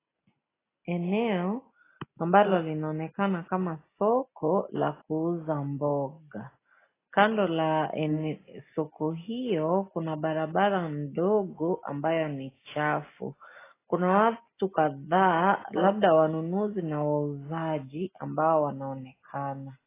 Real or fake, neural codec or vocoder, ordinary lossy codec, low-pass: real; none; AAC, 16 kbps; 3.6 kHz